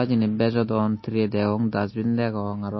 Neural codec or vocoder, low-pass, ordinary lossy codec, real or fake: none; 7.2 kHz; MP3, 24 kbps; real